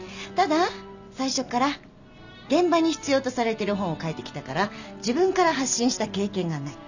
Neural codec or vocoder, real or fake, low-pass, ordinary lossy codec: none; real; 7.2 kHz; none